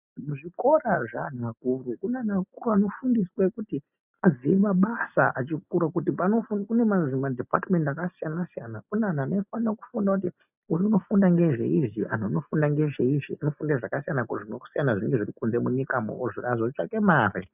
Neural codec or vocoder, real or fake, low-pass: none; real; 3.6 kHz